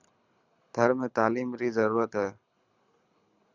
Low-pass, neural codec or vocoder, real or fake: 7.2 kHz; codec, 24 kHz, 6 kbps, HILCodec; fake